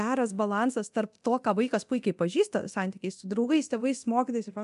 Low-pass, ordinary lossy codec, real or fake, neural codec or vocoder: 10.8 kHz; AAC, 96 kbps; fake; codec, 24 kHz, 0.9 kbps, DualCodec